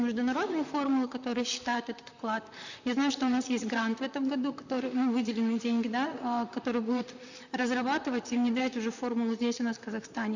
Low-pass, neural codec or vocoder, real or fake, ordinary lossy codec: 7.2 kHz; vocoder, 44.1 kHz, 128 mel bands, Pupu-Vocoder; fake; none